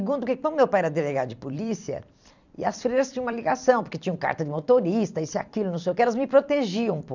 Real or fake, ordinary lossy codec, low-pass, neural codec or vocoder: fake; none; 7.2 kHz; vocoder, 44.1 kHz, 128 mel bands every 256 samples, BigVGAN v2